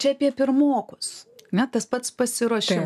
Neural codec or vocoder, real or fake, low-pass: none; real; 14.4 kHz